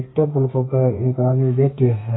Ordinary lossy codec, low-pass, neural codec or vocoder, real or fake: AAC, 16 kbps; 7.2 kHz; codec, 32 kHz, 1.9 kbps, SNAC; fake